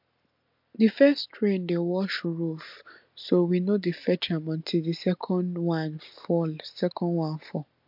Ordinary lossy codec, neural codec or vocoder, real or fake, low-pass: MP3, 48 kbps; none; real; 5.4 kHz